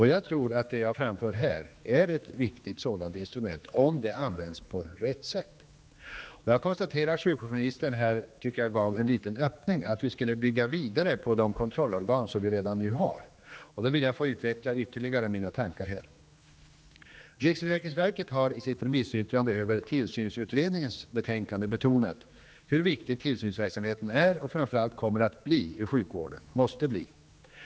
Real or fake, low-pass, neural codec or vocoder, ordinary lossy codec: fake; none; codec, 16 kHz, 2 kbps, X-Codec, HuBERT features, trained on general audio; none